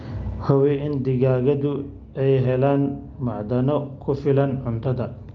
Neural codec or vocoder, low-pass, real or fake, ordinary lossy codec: none; 7.2 kHz; real; Opus, 24 kbps